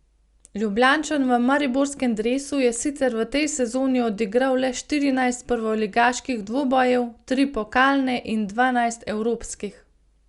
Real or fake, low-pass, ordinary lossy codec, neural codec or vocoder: real; 10.8 kHz; Opus, 64 kbps; none